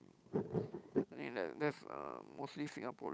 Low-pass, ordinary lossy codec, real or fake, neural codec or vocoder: none; none; fake; codec, 16 kHz, 8 kbps, FunCodec, trained on Chinese and English, 25 frames a second